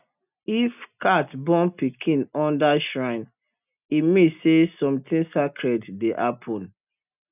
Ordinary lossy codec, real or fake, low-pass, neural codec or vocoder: none; real; 3.6 kHz; none